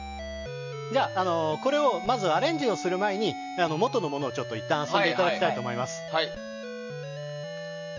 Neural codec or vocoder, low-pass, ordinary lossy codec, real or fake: none; 7.2 kHz; none; real